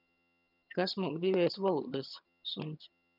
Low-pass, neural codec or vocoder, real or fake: 5.4 kHz; vocoder, 22.05 kHz, 80 mel bands, HiFi-GAN; fake